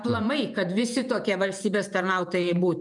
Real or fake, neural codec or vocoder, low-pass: fake; vocoder, 48 kHz, 128 mel bands, Vocos; 10.8 kHz